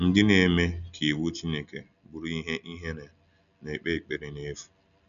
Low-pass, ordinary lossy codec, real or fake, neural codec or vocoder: 7.2 kHz; none; real; none